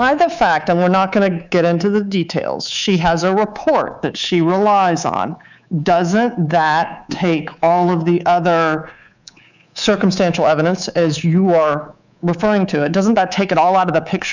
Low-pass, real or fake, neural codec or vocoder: 7.2 kHz; fake; codec, 24 kHz, 3.1 kbps, DualCodec